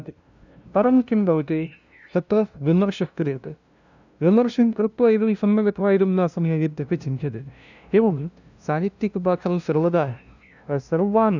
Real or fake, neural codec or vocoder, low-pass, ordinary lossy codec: fake; codec, 16 kHz, 0.5 kbps, FunCodec, trained on LibriTTS, 25 frames a second; 7.2 kHz; none